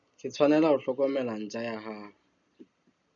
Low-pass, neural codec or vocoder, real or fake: 7.2 kHz; none; real